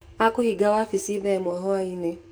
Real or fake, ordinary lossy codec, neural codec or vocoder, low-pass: fake; none; codec, 44.1 kHz, 7.8 kbps, DAC; none